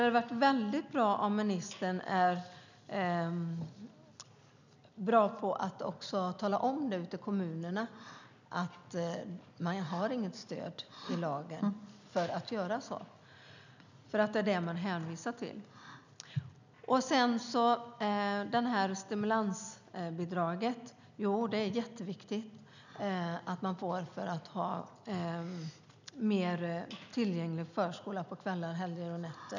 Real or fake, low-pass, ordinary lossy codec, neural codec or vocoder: real; 7.2 kHz; none; none